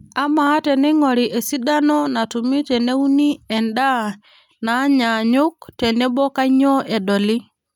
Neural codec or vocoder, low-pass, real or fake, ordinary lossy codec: none; 19.8 kHz; real; none